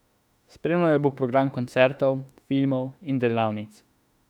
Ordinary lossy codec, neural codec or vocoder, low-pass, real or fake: none; autoencoder, 48 kHz, 32 numbers a frame, DAC-VAE, trained on Japanese speech; 19.8 kHz; fake